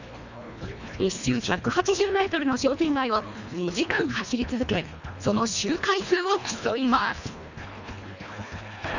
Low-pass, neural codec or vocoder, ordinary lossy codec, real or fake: 7.2 kHz; codec, 24 kHz, 1.5 kbps, HILCodec; none; fake